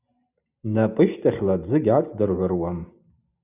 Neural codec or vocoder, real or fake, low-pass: none; real; 3.6 kHz